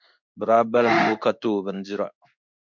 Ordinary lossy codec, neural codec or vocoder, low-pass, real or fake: MP3, 64 kbps; codec, 16 kHz in and 24 kHz out, 1 kbps, XY-Tokenizer; 7.2 kHz; fake